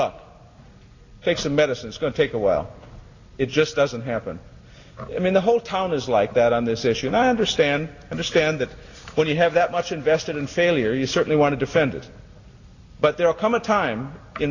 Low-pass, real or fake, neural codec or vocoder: 7.2 kHz; real; none